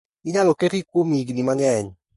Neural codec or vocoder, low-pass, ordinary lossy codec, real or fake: codec, 44.1 kHz, 3.4 kbps, Pupu-Codec; 14.4 kHz; MP3, 48 kbps; fake